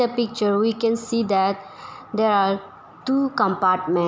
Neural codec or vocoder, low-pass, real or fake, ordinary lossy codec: none; none; real; none